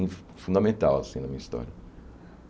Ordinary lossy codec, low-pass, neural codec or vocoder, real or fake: none; none; none; real